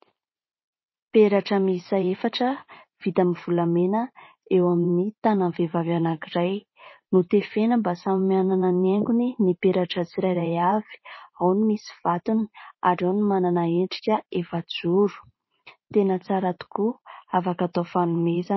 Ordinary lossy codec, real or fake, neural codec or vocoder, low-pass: MP3, 24 kbps; fake; vocoder, 44.1 kHz, 128 mel bands every 512 samples, BigVGAN v2; 7.2 kHz